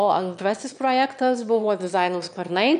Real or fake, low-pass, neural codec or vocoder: fake; 9.9 kHz; autoencoder, 22.05 kHz, a latent of 192 numbers a frame, VITS, trained on one speaker